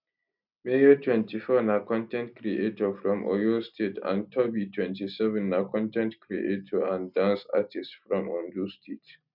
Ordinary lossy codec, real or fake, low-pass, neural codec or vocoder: none; real; 5.4 kHz; none